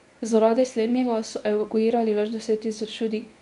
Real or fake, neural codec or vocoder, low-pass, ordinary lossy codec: fake; codec, 24 kHz, 0.9 kbps, WavTokenizer, medium speech release version 1; 10.8 kHz; MP3, 96 kbps